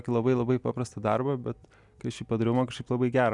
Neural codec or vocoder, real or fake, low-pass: none; real; 10.8 kHz